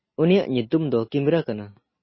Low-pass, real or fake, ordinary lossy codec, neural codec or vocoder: 7.2 kHz; real; MP3, 24 kbps; none